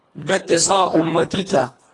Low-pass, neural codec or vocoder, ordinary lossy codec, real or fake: 10.8 kHz; codec, 24 kHz, 1.5 kbps, HILCodec; AAC, 32 kbps; fake